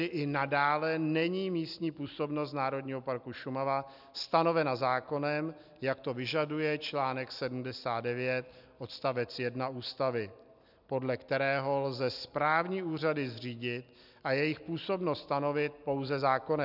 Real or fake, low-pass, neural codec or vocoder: real; 5.4 kHz; none